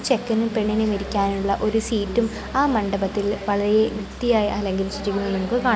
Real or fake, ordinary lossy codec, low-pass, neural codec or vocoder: real; none; none; none